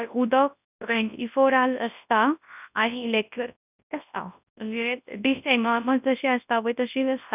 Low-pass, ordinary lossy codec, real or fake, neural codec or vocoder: 3.6 kHz; none; fake; codec, 24 kHz, 0.9 kbps, WavTokenizer, large speech release